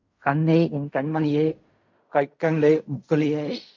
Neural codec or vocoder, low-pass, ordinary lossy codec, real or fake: codec, 16 kHz in and 24 kHz out, 0.4 kbps, LongCat-Audio-Codec, fine tuned four codebook decoder; 7.2 kHz; MP3, 48 kbps; fake